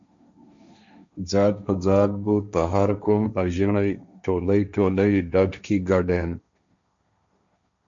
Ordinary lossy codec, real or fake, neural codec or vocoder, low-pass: MP3, 64 kbps; fake; codec, 16 kHz, 1.1 kbps, Voila-Tokenizer; 7.2 kHz